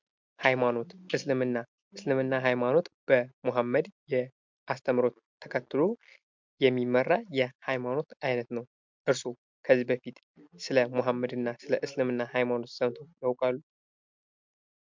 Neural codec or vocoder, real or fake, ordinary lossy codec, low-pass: none; real; MP3, 64 kbps; 7.2 kHz